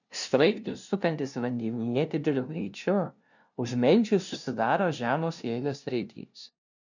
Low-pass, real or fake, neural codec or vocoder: 7.2 kHz; fake; codec, 16 kHz, 0.5 kbps, FunCodec, trained on LibriTTS, 25 frames a second